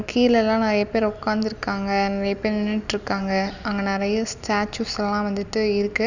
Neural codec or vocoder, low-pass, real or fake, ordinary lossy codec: none; 7.2 kHz; real; none